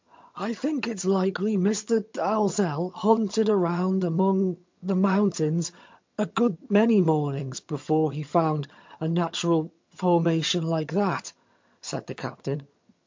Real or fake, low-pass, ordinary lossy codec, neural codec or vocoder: fake; 7.2 kHz; MP3, 48 kbps; vocoder, 22.05 kHz, 80 mel bands, HiFi-GAN